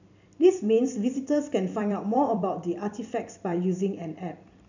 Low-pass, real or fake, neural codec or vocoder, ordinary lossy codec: 7.2 kHz; fake; vocoder, 44.1 kHz, 128 mel bands every 256 samples, BigVGAN v2; none